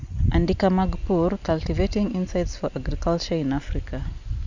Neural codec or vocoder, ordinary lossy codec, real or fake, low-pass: none; Opus, 64 kbps; real; 7.2 kHz